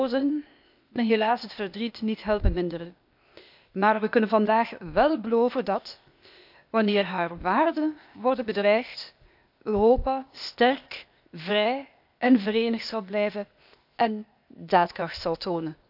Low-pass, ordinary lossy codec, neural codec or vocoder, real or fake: 5.4 kHz; none; codec, 16 kHz, 0.8 kbps, ZipCodec; fake